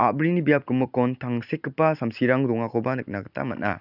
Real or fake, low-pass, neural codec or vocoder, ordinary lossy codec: real; 5.4 kHz; none; none